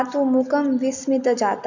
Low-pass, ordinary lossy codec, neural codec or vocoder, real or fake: 7.2 kHz; none; none; real